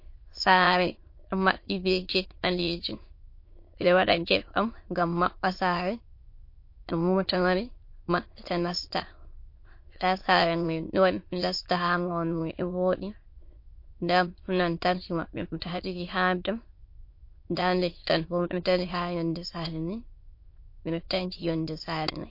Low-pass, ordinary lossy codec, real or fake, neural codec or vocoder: 5.4 kHz; MP3, 32 kbps; fake; autoencoder, 22.05 kHz, a latent of 192 numbers a frame, VITS, trained on many speakers